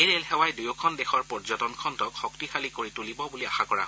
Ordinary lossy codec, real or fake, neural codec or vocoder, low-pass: none; real; none; none